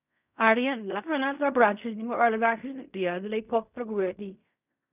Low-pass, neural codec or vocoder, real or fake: 3.6 kHz; codec, 16 kHz in and 24 kHz out, 0.4 kbps, LongCat-Audio-Codec, fine tuned four codebook decoder; fake